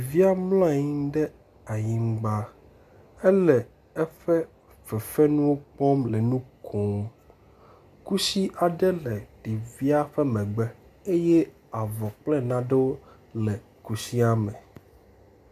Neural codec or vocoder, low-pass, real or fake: none; 14.4 kHz; real